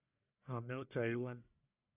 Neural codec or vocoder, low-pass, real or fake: codec, 44.1 kHz, 1.7 kbps, Pupu-Codec; 3.6 kHz; fake